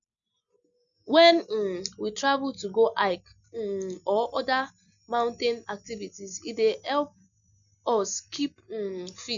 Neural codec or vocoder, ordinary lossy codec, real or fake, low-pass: none; none; real; 7.2 kHz